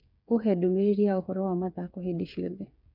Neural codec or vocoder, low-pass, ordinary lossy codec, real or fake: codec, 16 kHz, 8 kbps, FreqCodec, smaller model; 5.4 kHz; none; fake